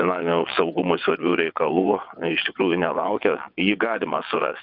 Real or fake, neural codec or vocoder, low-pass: fake; vocoder, 22.05 kHz, 80 mel bands, WaveNeXt; 5.4 kHz